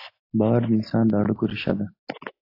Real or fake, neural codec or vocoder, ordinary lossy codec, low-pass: real; none; AAC, 32 kbps; 5.4 kHz